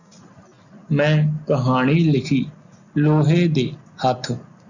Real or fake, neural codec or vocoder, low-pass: real; none; 7.2 kHz